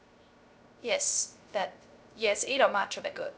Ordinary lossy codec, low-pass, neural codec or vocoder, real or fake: none; none; codec, 16 kHz, 0.3 kbps, FocalCodec; fake